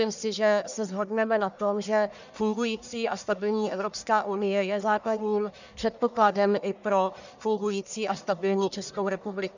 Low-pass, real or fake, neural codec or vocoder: 7.2 kHz; fake; codec, 44.1 kHz, 1.7 kbps, Pupu-Codec